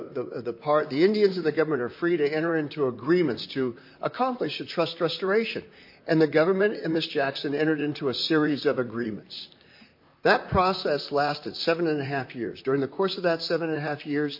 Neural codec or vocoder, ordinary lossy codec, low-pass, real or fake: vocoder, 44.1 kHz, 128 mel bands, Pupu-Vocoder; MP3, 32 kbps; 5.4 kHz; fake